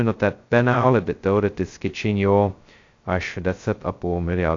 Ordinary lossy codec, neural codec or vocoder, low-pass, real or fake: AAC, 48 kbps; codec, 16 kHz, 0.2 kbps, FocalCodec; 7.2 kHz; fake